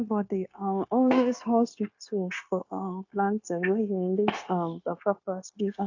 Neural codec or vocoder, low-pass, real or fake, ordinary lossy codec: codec, 16 kHz, 0.9 kbps, LongCat-Audio-Codec; 7.2 kHz; fake; AAC, 48 kbps